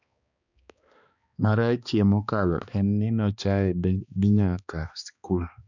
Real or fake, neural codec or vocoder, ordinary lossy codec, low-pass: fake; codec, 16 kHz, 2 kbps, X-Codec, HuBERT features, trained on balanced general audio; none; 7.2 kHz